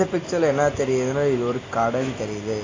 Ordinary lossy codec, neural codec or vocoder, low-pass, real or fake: AAC, 32 kbps; none; 7.2 kHz; real